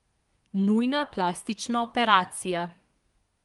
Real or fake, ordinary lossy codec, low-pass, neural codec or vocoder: fake; Opus, 32 kbps; 10.8 kHz; codec, 24 kHz, 1 kbps, SNAC